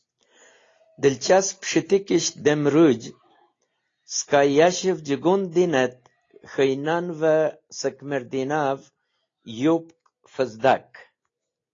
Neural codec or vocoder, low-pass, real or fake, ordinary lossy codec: none; 7.2 kHz; real; AAC, 32 kbps